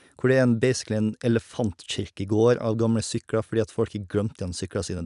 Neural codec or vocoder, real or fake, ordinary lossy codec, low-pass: none; real; none; 10.8 kHz